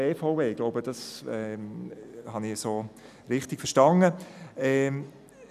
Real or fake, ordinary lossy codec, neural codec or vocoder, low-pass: real; none; none; 14.4 kHz